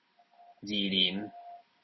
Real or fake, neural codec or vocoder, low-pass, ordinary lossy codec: real; none; 7.2 kHz; MP3, 24 kbps